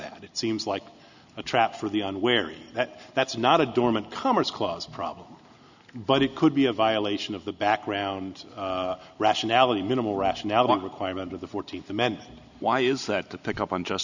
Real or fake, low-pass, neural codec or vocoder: real; 7.2 kHz; none